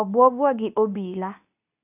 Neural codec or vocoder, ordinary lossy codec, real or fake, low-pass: codec, 24 kHz, 0.9 kbps, WavTokenizer, medium speech release version 2; none; fake; 3.6 kHz